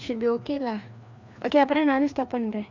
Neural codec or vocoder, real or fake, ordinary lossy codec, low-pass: codec, 16 kHz, 2 kbps, FreqCodec, larger model; fake; none; 7.2 kHz